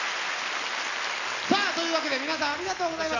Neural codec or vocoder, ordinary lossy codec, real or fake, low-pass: none; none; real; 7.2 kHz